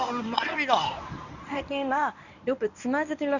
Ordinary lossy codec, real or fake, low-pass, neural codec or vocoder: none; fake; 7.2 kHz; codec, 24 kHz, 0.9 kbps, WavTokenizer, medium speech release version 1